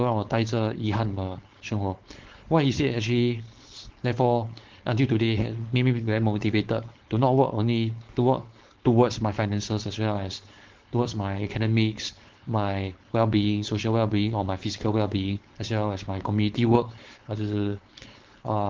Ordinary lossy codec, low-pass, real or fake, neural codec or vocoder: Opus, 16 kbps; 7.2 kHz; fake; codec, 16 kHz, 4.8 kbps, FACodec